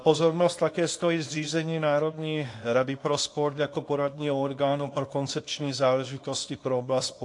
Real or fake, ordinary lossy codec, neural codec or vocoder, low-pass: fake; AAC, 48 kbps; codec, 24 kHz, 0.9 kbps, WavTokenizer, small release; 10.8 kHz